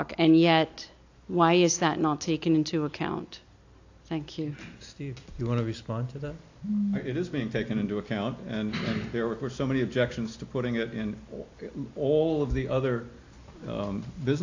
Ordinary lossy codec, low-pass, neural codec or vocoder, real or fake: AAC, 48 kbps; 7.2 kHz; none; real